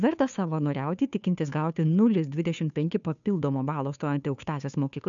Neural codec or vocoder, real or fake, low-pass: codec, 16 kHz, 2 kbps, FunCodec, trained on Chinese and English, 25 frames a second; fake; 7.2 kHz